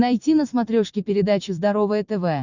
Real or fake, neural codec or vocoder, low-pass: fake; vocoder, 44.1 kHz, 128 mel bands every 256 samples, BigVGAN v2; 7.2 kHz